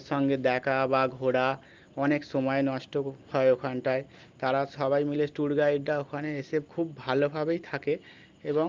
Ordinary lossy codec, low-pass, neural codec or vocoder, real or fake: Opus, 32 kbps; 7.2 kHz; none; real